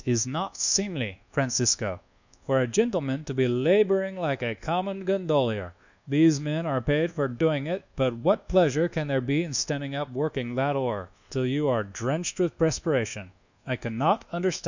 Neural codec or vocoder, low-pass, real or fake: codec, 24 kHz, 1.2 kbps, DualCodec; 7.2 kHz; fake